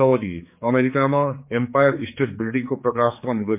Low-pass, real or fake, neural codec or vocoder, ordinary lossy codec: 3.6 kHz; fake; codec, 16 kHz, 4 kbps, X-Codec, HuBERT features, trained on general audio; MP3, 32 kbps